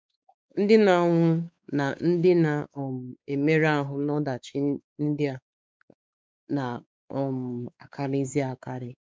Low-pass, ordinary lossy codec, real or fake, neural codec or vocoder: none; none; fake; codec, 16 kHz, 4 kbps, X-Codec, WavLM features, trained on Multilingual LibriSpeech